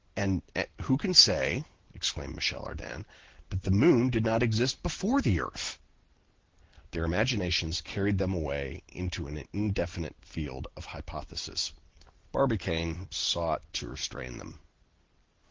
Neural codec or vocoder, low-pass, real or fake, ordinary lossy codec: none; 7.2 kHz; real; Opus, 16 kbps